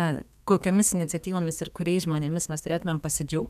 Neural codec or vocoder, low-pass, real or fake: codec, 32 kHz, 1.9 kbps, SNAC; 14.4 kHz; fake